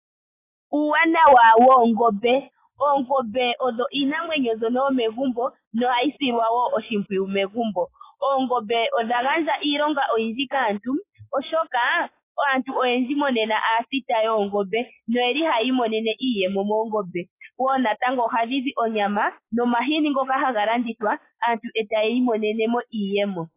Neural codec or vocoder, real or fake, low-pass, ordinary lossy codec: none; real; 3.6 kHz; AAC, 24 kbps